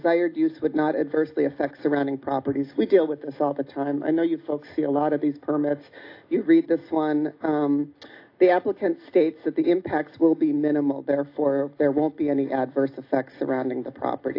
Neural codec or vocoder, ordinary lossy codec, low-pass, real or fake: none; AAC, 32 kbps; 5.4 kHz; real